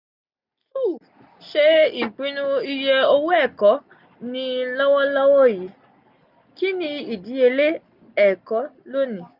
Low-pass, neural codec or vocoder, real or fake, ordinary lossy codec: 5.4 kHz; none; real; none